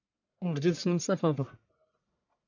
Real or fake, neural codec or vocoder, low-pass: fake; codec, 44.1 kHz, 1.7 kbps, Pupu-Codec; 7.2 kHz